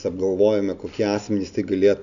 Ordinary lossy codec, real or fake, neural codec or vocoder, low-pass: MP3, 96 kbps; real; none; 7.2 kHz